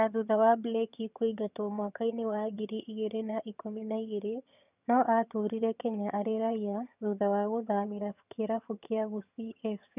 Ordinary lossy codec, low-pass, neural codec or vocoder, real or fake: none; 3.6 kHz; vocoder, 22.05 kHz, 80 mel bands, HiFi-GAN; fake